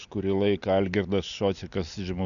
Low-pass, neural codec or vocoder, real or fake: 7.2 kHz; none; real